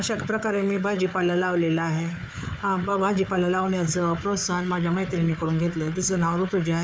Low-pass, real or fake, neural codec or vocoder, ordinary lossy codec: none; fake; codec, 16 kHz, 4 kbps, FunCodec, trained on Chinese and English, 50 frames a second; none